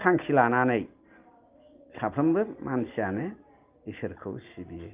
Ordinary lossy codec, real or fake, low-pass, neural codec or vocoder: Opus, 24 kbps; real; 3.6 kHz; none